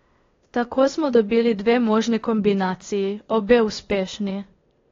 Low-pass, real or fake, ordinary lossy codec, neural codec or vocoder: 7.2 kHz; fake; AAC, 32 kbps; codec, 16 kHz, 0.8 kbps, ZipCodec